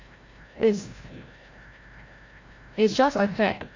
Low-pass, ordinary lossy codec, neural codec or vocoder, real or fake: 7.2 kHz; AAC, 48 kbps; codec, 16 kHz, 0.5 kbps, FreqCodec, larger model; fake